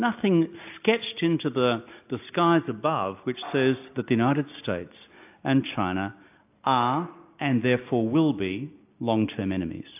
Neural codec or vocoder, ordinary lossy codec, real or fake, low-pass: none; AAC, 32 kbps; real; 3.6 kHz